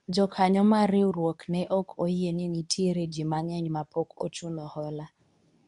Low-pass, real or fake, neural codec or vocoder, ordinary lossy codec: 10.8 kHz; fake; codec, 24 kHz, 0.9 kbps, WavTokenizer, medium speech release version 2; none